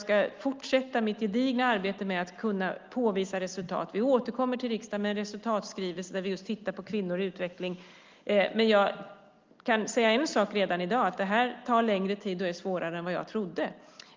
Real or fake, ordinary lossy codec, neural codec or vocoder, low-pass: real; Opus, 32 kbps; none; 7.2 kHz